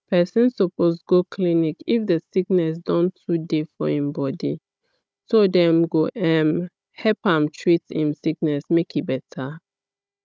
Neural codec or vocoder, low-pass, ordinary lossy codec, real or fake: codec, 16 kHz, 16 kbps, FunCodec, trained on Chinese and English, 50 frames a second; none; none; fake